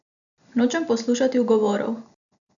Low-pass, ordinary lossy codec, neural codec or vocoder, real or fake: 7.2 kHz; MP3, 96 kbps; none; real